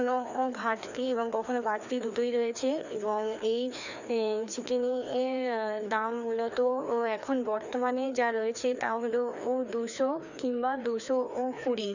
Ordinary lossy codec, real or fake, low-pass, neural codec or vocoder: none; fake; 7.2 kHz; codec, 16 kHz, 2 kbps, FreqCodec, larger model